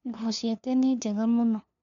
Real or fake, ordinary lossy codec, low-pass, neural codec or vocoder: fake; none; 7.2 kHz; codec, 16 kHz, 2 kbps, FunCodec, trained on LibriTTS, 25 frames a second